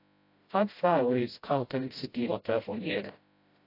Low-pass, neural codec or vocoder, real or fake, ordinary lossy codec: 5.4 kHz; codec, 16 kHz, 0.5 kbps, FreqCodec, smaller model; fake; AAC, 32 kbps